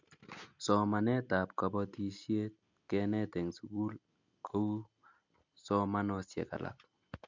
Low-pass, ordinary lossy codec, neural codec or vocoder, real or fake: 7.2 kHz; MP3, 64 kbps; none; real